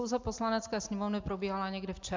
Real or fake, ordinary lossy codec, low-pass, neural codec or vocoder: real; MP3, 64 kbps; 7.2 kHz; none